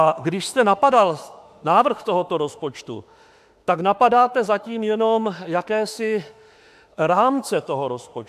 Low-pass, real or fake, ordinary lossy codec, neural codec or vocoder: 14.4 kHz; fake; AAC, 96 kbps; autoencoder, 48 kHz, 32 numbers a frame, DAC-VAE, trained on Japanese speech